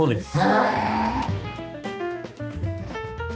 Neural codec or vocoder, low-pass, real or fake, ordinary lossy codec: codec, 16 kHz, 2 kbps, X-Codec, HuBERT features, trained on balanced general audio; none; fake; none